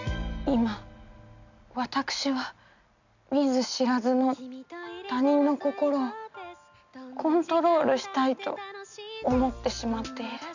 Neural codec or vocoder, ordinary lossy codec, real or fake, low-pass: none; none; real; 7.2 kHz